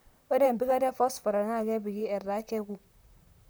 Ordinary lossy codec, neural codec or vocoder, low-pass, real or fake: none; vocoder, 44.1 kHz, 128 mel bands, Pupu-Vocoder; none; fake